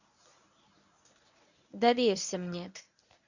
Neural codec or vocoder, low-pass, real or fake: codec, 24 kHz, 0.9 kbps, WavTokenizer, medium speech release version 1; 7.2 kHz; fake